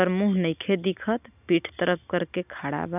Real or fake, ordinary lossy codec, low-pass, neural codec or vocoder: real; none; 3.6 kHz; none